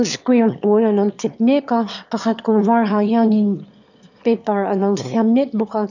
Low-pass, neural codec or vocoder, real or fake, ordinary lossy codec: 7.2 kHz; autoencoder, 22.05 kHz, a latent of 192 numbers a frame, VITS, trained on one speaker; fake; none